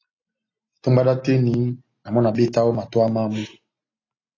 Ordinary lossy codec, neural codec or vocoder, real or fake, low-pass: AAC, 32 kbps; none; real; 7.2 kHz